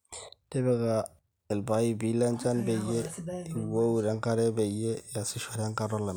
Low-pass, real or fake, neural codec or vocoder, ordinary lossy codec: none; real; none; none